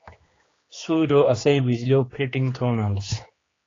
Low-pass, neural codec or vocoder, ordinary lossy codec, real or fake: 7.2 kHz; codec, 16 kHz, 2 kbps, X-Codec, HuBERT features, trained on general audio; AAC, 32 kbps; fake